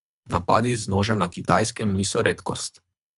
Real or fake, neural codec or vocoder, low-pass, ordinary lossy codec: fake; codec, 24 kHz, 3 kbps, HILCodec; 10.8 kHz; none